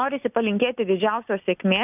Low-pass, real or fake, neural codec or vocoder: 3.6 kHz; real; none